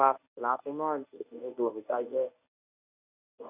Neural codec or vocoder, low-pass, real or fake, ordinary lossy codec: codec, 24 kHz, 0.9 kbps, WavTokenizer, medium speech release version 1; 3.6 kHz; fake; none